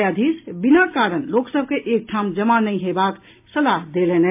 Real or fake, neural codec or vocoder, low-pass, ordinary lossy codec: real; none; 3.6 kHz; MP3, 32 kbps